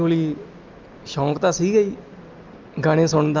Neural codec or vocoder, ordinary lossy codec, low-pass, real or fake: none; Opus, 32 kbps; 7.2 kHz; real